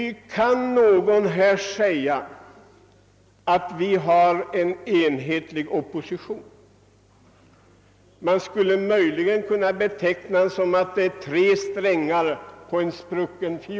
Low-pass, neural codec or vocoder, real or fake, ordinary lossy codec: none; none; real; none